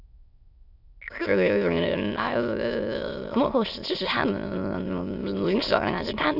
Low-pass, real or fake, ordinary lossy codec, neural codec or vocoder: 5.4 kHz; fake; none; autoencoder, 22.05 kHz, a latent of 192 numbers a frame, VITS, trained on many speakers